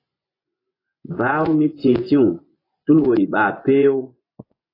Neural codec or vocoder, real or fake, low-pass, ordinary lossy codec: vocoder, 24 kHz, 100 mel bands, Vocos; fake; 5.4 kHz; AAC, 24 kbps